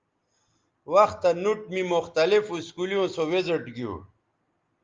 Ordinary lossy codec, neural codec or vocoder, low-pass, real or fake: Opus, 32 kbps; none; 7.2 kHz; real